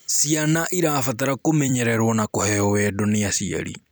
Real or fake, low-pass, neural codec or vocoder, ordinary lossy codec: fake; none; vocoder, 44.1 kHz, 128 mel bands every 256 samples, BigVGAN v2; none